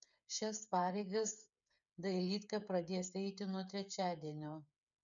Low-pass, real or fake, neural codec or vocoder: 7.2 kHz; fake; codec, 16 kHz, 8 kbps, FreqCodec, smaller model